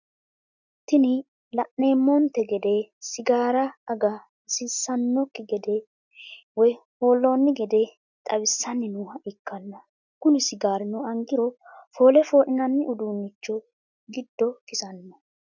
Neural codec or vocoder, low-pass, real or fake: none; 7.2 kHz; real